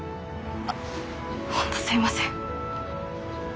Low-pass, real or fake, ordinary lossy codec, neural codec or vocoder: none; real; none; none